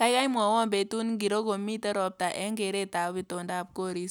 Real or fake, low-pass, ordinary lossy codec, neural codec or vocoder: real; none; none; none